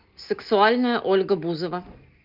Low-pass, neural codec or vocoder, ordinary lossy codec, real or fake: 5.4 kHz; none; Opus, 24 kbps; real